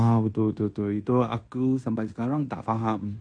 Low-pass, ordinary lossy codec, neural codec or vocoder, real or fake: 9.9 kHz; MP3, 48 kbps; codec, 16 kHz in and 24 kHz out, 0.9 kbps, LongCat-Audio-Codec, fine tuned four codebook decoder; fake